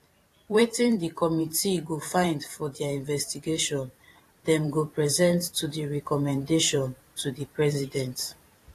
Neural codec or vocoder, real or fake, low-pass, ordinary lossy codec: vocoder, 44.1 kHz, 128 mel bands every 512 samples, BigVGAN v2; fake; 14.4 kHz; AAC, 48 kbps